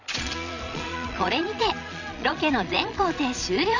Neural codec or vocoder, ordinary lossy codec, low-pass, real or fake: vocoder, 22.05 kHz, 80 mel bands, Vocos; none; 7.2 kHz; fake